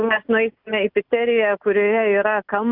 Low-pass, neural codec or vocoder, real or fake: 5.4 kHz; none; real